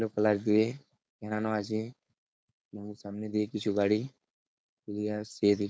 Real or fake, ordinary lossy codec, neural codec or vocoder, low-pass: fake; none; codec, 16 kHz, 4.8 kbps, FACodec; none